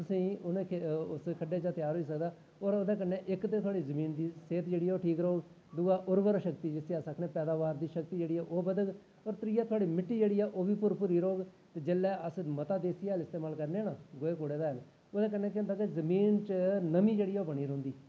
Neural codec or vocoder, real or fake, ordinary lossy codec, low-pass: none; real; none; none